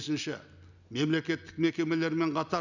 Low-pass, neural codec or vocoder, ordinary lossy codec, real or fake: 7.2 kHz; none; none; real